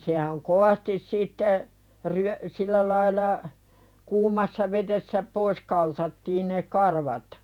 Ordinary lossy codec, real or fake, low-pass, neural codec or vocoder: none; fake; 19.8 kHz; vocoder, 48 kHz, 128 mel bands, Vocos